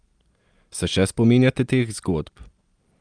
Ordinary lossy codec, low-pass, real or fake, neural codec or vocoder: Opus, 32 kbps; 9.9 kHz; real; none